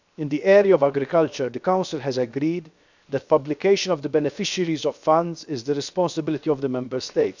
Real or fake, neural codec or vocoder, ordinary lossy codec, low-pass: fake; codec, 16 kHz, 0.7 kbps, FocalCodec; none; 7.2 kHz